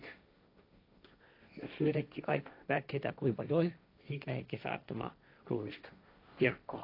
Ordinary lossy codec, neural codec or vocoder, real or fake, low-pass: AAC, 32 kbps; codec, 16 kHz, 1.1 kbps, Voila-Tokenizer; fake; 5.4 kHz